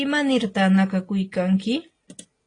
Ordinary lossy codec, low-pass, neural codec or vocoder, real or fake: AAC, 32 kbps; 9.9 kHz; none; real